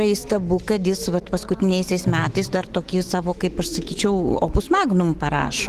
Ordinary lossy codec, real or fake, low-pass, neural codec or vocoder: Opus, 16 kbps; fake; 14.4 kHz; autoencoder, 48 kHz, 128 numbers a frame, DAC-VAE, trained on Japanese speech